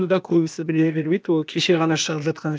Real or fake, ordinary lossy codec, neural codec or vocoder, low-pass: fake; none; codec, 16 kHz, 0.8 kbps, ZipCodec; none